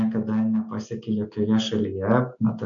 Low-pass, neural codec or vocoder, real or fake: 7.2 kHz; none; real